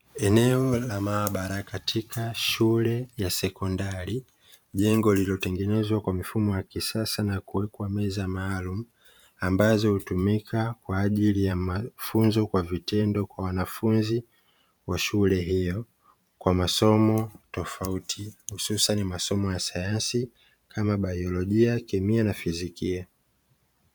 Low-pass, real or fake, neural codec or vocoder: 19.8 kHz; fake; vocoder, 44.1 kHz, 128 mel bands every 512 samples, BigVGAN v2